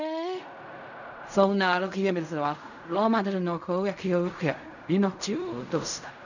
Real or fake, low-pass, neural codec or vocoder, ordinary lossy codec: fake; 7.2 kHz; codec, 16 kHz in and 24 kHz out, 0.4 kbps, LongCat-Audio-Codec, fine tuned four codebook decoder; none